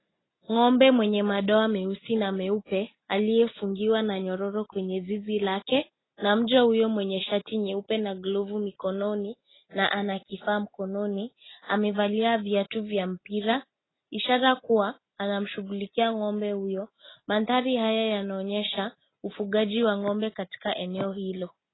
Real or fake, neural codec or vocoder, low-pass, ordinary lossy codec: real; none; 7.2 kHz; AAC, 16 kbps